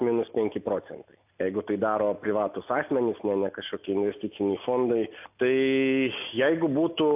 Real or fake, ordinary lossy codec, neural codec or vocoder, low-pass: real; AAC, 32 kbps; none; 3.6 kHz